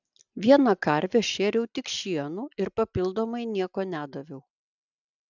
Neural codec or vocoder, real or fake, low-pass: none; real; 7.2 kHz